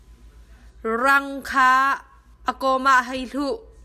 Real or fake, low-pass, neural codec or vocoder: real; 14.4 kHz; none